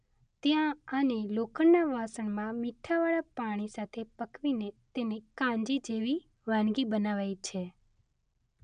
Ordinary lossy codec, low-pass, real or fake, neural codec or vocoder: none; 9.9 kHz; real; none